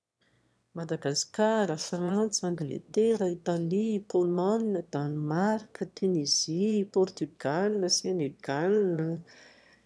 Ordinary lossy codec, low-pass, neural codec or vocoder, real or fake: none; none; autoencoder, 22.05 kHz, a latent of 192 numbers a frame, VITS, trained on one speaker; fake